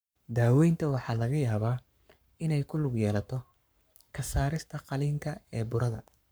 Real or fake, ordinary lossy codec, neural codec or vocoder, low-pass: fake; none; codec, 44.1 kHz, 7.8 kbps, Pupu-Codec; none